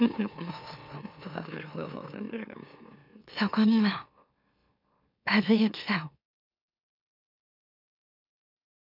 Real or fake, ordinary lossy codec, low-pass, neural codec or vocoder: fake; none; 5.4 kHz; autoencoder, 44.1 kHz, a latent of 192 numbers a frame, MeloTTS